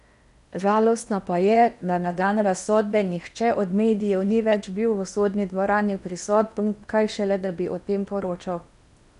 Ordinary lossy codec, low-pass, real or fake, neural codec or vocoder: none; 10.8 kHz; fake; codec, 16 kHz in and 24 kHz out, 0.8 kbps, FocalCodec, streaming, 65536 codes